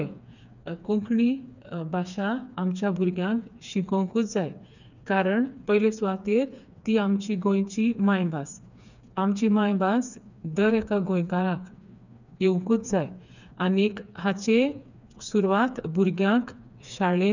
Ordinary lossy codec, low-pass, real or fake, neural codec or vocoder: none; 7.2 kHz; fake; codec, 16 kHz, 4 kbps, FreqCodec, smaller model